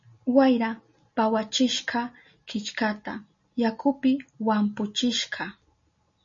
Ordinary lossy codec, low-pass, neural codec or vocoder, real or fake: MP3, 32 kbps; 7.2 kHz; none; real